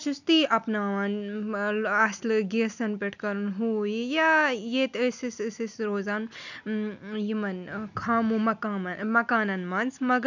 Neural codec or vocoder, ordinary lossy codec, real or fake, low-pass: none; none; real; 7.2 kHz